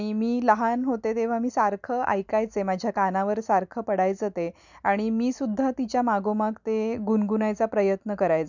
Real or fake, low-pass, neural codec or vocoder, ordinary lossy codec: real; 7.2 kHz; none; none